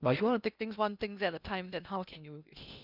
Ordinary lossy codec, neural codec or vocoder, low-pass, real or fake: none; codec, 16 kHz in and 24 kHz out, 0.8 kbps, FocalCodec, streaming, 65536 codes; 5.4 kHz; fake